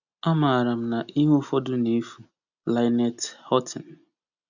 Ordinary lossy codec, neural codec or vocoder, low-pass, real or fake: none; none; 7.2 kHz; real